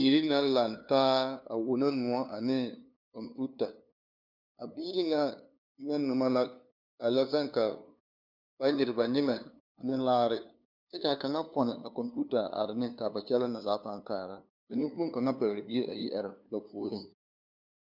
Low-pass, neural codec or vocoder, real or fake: 5.4 kHz; codec, 16 kHz, 2 kbps, FunCodec, trained on LibriTTS, 25 frames a second; fake